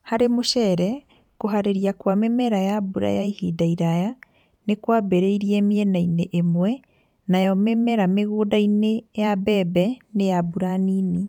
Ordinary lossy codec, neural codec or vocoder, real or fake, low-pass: none; vocoder, 44.1 kHz, 128 mel bands every 512 samples, BigVGAN v2; fake; 19.8 kHz